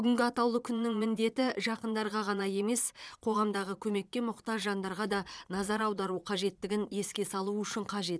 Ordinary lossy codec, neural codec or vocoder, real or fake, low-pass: none; vocoder, 22.05 kHz, 80 mel bands, Vocos; fake; none